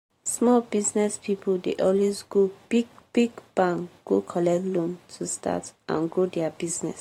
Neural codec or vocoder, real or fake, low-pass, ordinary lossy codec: vocoder, 44.1 kHz, 128 mel bands every 512 samples, BigVGAN v2; fake; 19.8 kHz; AAC, 48 kbps